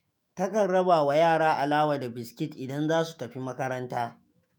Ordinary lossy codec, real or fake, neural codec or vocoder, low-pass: none; fake; autoencoder, 48 kHz, 128 numbers a frame, DAC-VAE, trained on Japanese speech; none